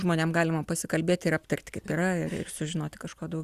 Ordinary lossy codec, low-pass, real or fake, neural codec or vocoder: Opus, 64 kbps; 14.4 kHz; real; none